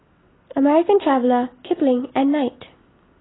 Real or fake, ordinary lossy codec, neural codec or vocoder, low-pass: real; AAC, 16 kbps; none; 7.2 kHz